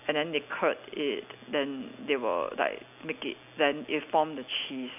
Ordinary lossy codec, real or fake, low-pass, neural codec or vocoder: none; real; 3.6 kHz; none